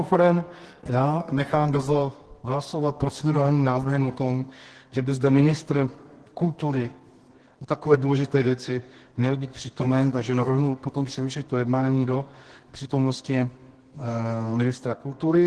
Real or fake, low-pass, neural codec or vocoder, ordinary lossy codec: fake; 10.8 kHz; codec, 24 kHz, 0.9 kbps, WavTokenizer, medium music audio release; Opus, 16 kbps